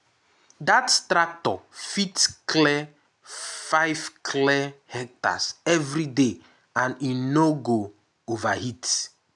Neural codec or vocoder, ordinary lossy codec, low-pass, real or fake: none; none; 10.8 kHz; real